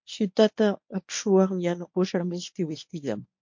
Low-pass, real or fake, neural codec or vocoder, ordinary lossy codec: 7.2 kHz; fake; codec, 24 kHz, 0.9 kbps, WavTokenizer, medium speech release version 1; MP3, 48 kbps